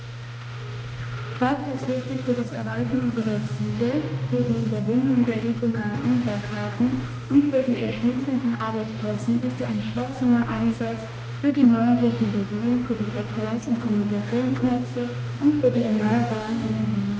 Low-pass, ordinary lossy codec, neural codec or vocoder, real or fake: none; none; codec, 16 kHz, 1 kbps, X-Codec, HuBERT features, trained on balanced general audio; fake